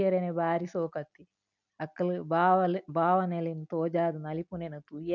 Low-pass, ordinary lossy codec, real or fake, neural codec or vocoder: 7.2 kHz; none; real; none